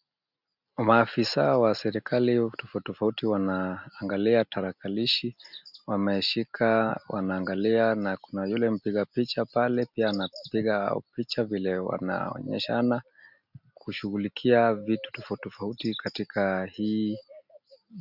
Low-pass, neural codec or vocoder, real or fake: 5.4 kHz; none; real